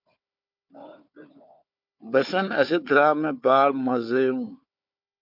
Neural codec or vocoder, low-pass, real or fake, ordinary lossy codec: codec, 16 kHz, 4 kbps, FunCodec, trained on Chinese and English, 50 frames a second; 5.4 kHz; fake; MP3, 48 kbps